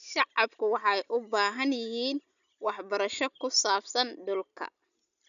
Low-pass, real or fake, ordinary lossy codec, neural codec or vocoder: 7.2 kHz; real; none; none